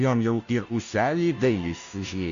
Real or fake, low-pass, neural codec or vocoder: fake; 7.2 kHz; codec, 16 kHz, 0.5 kbps, FunCodec, trained on Chinese and English, 25 frames a second